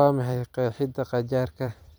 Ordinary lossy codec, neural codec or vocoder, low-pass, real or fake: none; vocoder, 44.1 kHz, 128 mel bands every 256 samples, BigVGAN v2; none; fake